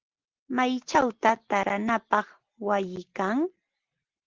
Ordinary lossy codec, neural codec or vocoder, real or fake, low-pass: Opus, 16 kbps; none; real; 7.2 kHz